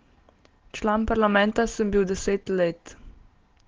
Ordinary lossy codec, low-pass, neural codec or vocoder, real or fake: Opus, 16 kbps; 7.2 kHz; none; real